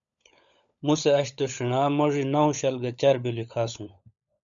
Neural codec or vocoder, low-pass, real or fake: codec, 16 kHz, 16 kbps, FunCodec, trained on LibriTTS, 50 frames a second; 7.2 kHz; fake